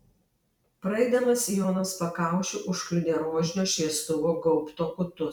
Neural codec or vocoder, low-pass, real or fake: vocoder, 44.1 kHz, 128 mel bands every 256 samples, BigVGAN v2; 19.8 kHz; fake